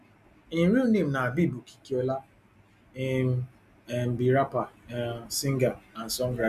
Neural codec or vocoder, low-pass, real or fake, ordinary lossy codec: none; 14.4 kHz; real; none